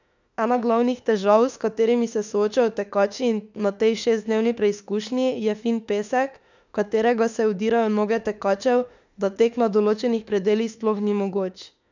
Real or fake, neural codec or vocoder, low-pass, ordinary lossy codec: fake; autoencoder, 48 kHz, 32 numbers a frame, DAC-VAE, trained on Japanese speech; 7.2 kHz; none